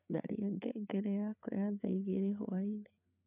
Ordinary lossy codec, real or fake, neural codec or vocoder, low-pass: none; fake; codec, 16 kHz, 4 kbps, FreqCodec, larger model; 3.6 kHz